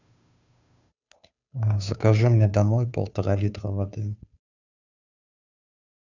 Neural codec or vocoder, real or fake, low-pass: codec, 16 kHz, 2 kbps, FunCodec, trained on Chinese and English, 25 frames a second; fake; 7.2 kHz